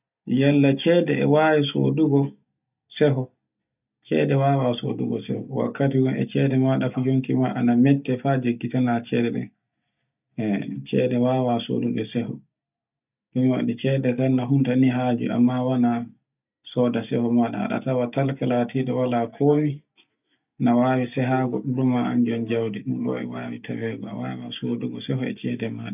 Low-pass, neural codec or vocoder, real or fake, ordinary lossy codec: 3.6 kHz; none; real; none